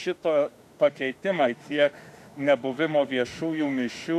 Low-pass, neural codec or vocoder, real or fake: 14.4 kHz; autoencoder, 48 kHz, 32 numbers a frame, DAC-VAE, trained on Japanese speech; fake